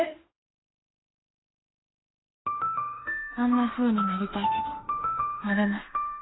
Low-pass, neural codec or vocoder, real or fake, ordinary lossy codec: 7.2 kHz; autoencoder, 48 kHz, 32 numbers a frame, DAC-VAE, trained on Japanese speech; fake; AAC, 16 kbps